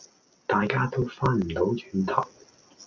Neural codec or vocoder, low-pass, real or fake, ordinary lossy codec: none; 7.2 kHz; real; MP3, 64 kbps